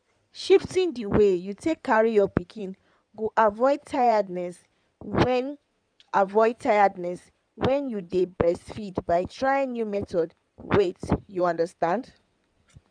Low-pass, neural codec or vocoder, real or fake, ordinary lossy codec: 9.9 kHz; codec, 16 kHz in and 24 kHz out, 2.2 kbps, FireRedTTS-2 codec; fake; none